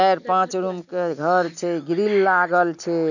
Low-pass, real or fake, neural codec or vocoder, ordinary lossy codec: 7.2 kHz; real; none; none